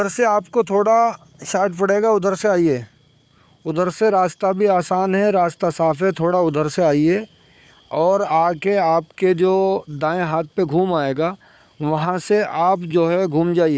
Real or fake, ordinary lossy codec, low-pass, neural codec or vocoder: fake; none; none; codec, 16 kHz, 4 kbps, FunCodec, trained on Chinese and English, 50 frames a second